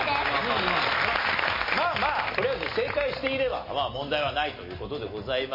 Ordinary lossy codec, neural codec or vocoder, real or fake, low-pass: MP3, 24 kbps; none; real; 5.4 kHz